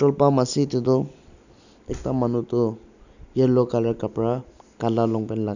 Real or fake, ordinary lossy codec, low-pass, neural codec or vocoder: real; none; 7.2 kHz; none